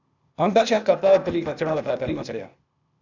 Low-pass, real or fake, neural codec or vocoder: 7.2 kHz; fake; codec, 16 kHz, 0.8 kbps, ZipCodec